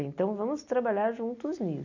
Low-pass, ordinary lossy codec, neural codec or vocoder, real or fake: 7.2 kHz; none; none; real